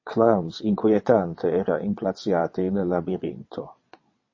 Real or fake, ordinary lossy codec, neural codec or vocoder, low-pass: fake; MP3, 32 kbps; vocoder, 22.05 kHz, 80 mel bands, WaveNeXt; 7.2 kHz